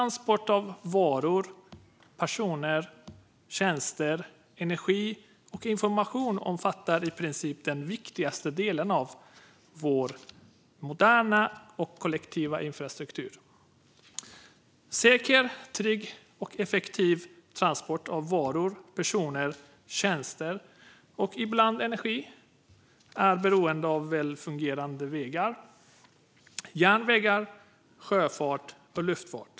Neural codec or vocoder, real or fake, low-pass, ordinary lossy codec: none; real; none; none